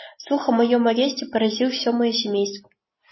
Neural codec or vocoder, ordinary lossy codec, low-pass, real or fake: none; MP3, 24 kbps; 7.2 kHz; real